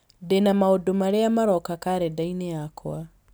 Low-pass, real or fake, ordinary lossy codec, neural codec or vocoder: none; real; none; none